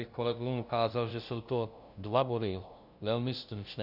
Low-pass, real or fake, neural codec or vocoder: 5.4 kHz; fake; codec, 16 kHz, 0.5 kbps, FunCodec, trained on LibriTTS, 25 frames a second